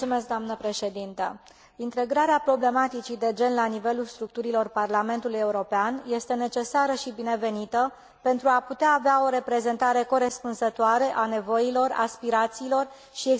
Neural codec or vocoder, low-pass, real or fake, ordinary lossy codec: none; none; real; none